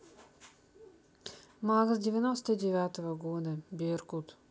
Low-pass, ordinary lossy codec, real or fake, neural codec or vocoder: none; none; real; none